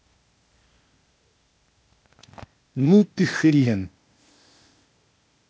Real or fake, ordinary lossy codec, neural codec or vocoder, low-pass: fake; none; codec, 16 kHz, 0.8 kbps, ZipCodec; none